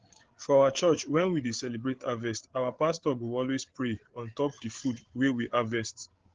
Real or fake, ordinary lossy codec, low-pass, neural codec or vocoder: fake; Opus, 16 kbps; 7.2 kHz; codec, 16 kHz, 8 kbps, FreqCodec, larger model